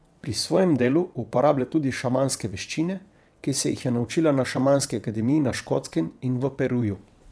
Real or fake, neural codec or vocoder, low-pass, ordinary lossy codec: fake; vocoder, 22.05 kHz, 80 mel bands, WaveNeXt; none; none